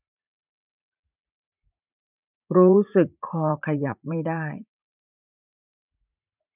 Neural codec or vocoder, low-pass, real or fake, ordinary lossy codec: vocoder, 44.1 kHz, 80 mel bands, Vocos; 3.6 kHz; fake; none